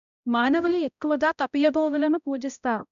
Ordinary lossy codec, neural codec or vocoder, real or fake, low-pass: none; codec, 16 kHz, 0.5 kbps, X-Codec, HuBERT features, trained on balanced general audio; fake; 7.2 kHz